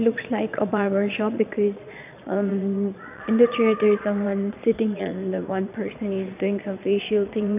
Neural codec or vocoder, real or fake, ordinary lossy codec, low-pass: vocoder, 22.05 kHz, 80 mel bands, Vocos; fake; none; 3.6 kHz